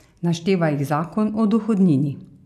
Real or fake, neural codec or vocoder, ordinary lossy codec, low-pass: real; none; none; 14.4 kHz